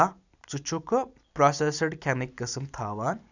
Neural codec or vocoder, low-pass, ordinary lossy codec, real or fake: none; 7.2 kHz; none; real